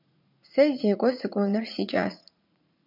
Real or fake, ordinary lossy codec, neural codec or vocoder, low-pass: fake; MP3, 48 kbps; vocoder, 44.1 kHz, 80 mel bands, Vocos; 5.4 kHz